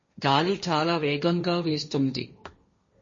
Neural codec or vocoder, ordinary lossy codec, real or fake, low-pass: codec, 16 kHz, 1.1 kbps, Voila-Tokenizer; MP3, 32 kbps; fake; 7.2 kHz